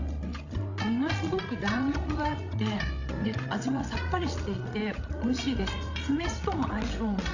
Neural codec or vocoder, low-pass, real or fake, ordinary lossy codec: codec, 16 kHz, 16 kbps, FreqCodec, larger model; 7.2 kHz; fake; AAC, 48 kbps